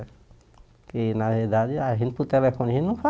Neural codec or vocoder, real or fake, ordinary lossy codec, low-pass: none; real; none; none